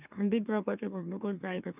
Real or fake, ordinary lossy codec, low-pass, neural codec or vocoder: fake; none; 3.6 kHz; autoencoder, 44.1 kHz, a latent of 192 numbers a frame, MeloTTS